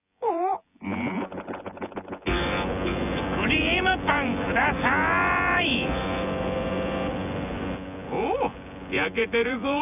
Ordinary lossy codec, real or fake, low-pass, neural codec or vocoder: none; fake; 3.6 kHz; vocoder, 24 kHz, 100 mel bands, Vocos